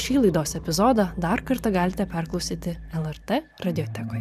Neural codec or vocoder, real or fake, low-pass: vocoder, 44.1 kHz, 128 mel bands every 512 samples, BigVGAN v2; fake; 14.4 kHz